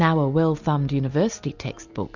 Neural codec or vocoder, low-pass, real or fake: none; 7.2 kHz; real